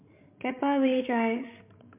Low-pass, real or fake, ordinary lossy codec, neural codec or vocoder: 3.6 kHz; fake; MP3, 32 kbps; codec, 16 kHz, 16 kbps, FreqCodec, larger model